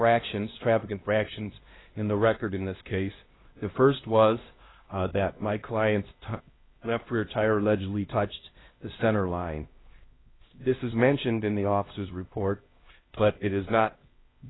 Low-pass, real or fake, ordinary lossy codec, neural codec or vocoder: 7.2 kHz; fake; AAC, 16 kbps; codec, 16 kHz, 1 kbps, X-Codec, HuBERT features, trained on LibriSpeech